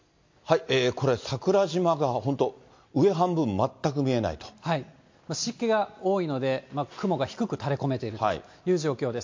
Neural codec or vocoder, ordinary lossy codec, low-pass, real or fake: none; MP3, 48 kbps; 7.2 kHz; real